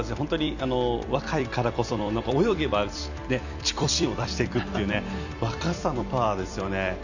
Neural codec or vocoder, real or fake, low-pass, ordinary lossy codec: vocoder, 44.1 kHz, 128 mel bands every 256 samples, BigVGAN v2; fake; 7.2 kHz; none